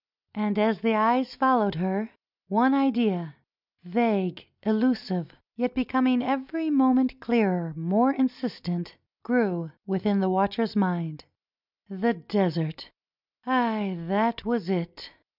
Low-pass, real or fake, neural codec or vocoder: 5.4 kHz; real; none